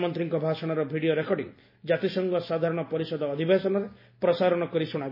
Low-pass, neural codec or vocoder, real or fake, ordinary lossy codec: 5.4 kHz; none; real; MP3, 24 kbps